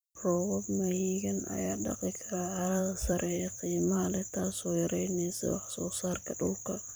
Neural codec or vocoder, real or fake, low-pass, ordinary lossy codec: vocoder, 44.1 kHz, 128 mel bands every 256 samples, BigVGAN v2; fake; none; none